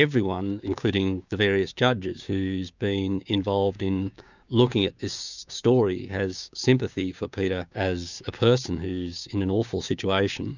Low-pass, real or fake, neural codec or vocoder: 7.2 kHz; fake; codec, 16 kHz, 6 kbps, DAC